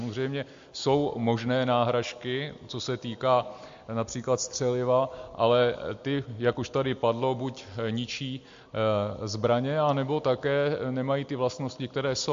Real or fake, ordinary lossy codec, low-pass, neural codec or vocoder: real; MP3, 48 kbps; 7.2 kHz; none